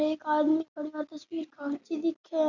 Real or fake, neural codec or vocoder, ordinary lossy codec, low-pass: real; none; none; 7.2 kHz